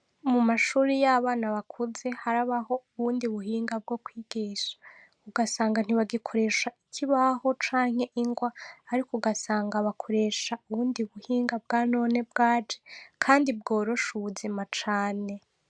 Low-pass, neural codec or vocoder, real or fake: 9.9 kHz; none; real